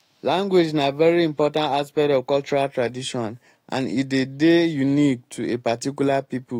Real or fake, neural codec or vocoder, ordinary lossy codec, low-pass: fake; autoencoder, 48 kHz, 128 numbers a frame, DAC-VAE, trained on Japanese speech; AAC, 48 kbps; 19.8 kHz